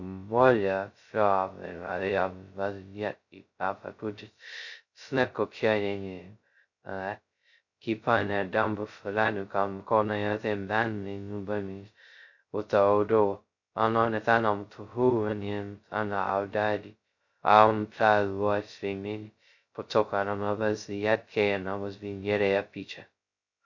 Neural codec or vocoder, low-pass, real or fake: codec, 16 kHz, 0.2 kbps, FocalCodec; 7.2 kHz; fake